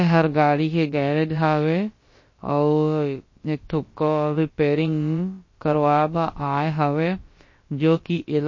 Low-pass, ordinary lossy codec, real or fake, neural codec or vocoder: 7.2 kHz; MP3, 32 kbps; fake; codec, 16 kHz, about 1 kbps, DyCAST, with the encoder's durations